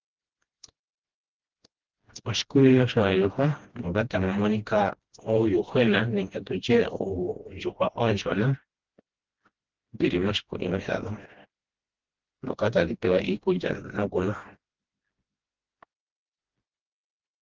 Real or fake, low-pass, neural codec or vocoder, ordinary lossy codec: fake; 7.2 kHz; codec, 16 kHz, 1 kbps, FreqCodec, smaller model; Opus, 16 kbps